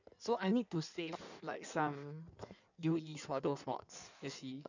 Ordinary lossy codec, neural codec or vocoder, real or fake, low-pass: none; codec, 16 kHz in and 24 kHz out, 1.1 kbps, FireRedTTS-2 codec; fake; 7.2 kHz